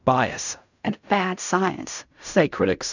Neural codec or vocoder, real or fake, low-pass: codec, 16 kHz in and 24 kHz out, 0.4 kbps, LongCat-Audio-Codec, fine tuned four codebook decoder; fake; 7.2 kHz